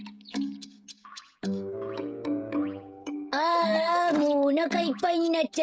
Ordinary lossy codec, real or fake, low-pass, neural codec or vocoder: none; fake; none; codec, 16 kHz, 16 kbps, FreqCodec, smaller model